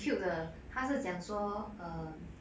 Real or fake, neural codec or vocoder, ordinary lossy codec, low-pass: real; none; none; none